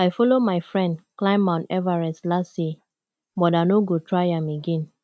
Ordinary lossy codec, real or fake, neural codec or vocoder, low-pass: none; real; none; none